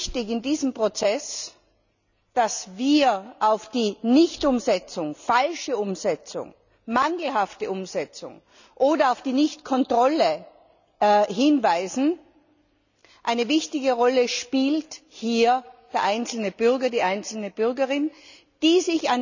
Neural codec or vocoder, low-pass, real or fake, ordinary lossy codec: none; 7.2 kHz; real; none